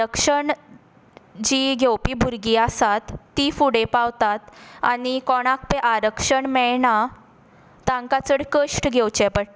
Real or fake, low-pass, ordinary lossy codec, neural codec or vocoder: real; none; none; none